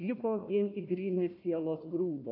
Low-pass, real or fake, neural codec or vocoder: 5.4 kHz; fake; codec, 16 kHz, 1 kbps, FunCodec, trained on Chinese and English, 50 frames a second